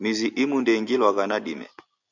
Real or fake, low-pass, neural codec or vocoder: real; 7.2 kHz; none